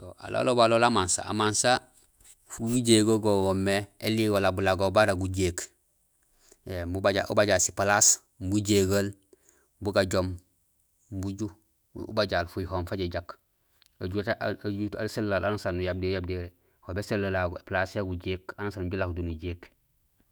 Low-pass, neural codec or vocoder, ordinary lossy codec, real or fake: none; autoencoder, 48 kHz, 128 numbers a frame, DAC-VAE, trained on Japanese speech; none; fake